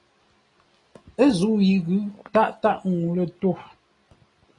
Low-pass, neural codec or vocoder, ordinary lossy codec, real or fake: 10.8 kHz; none; AAC, 32 kbps; real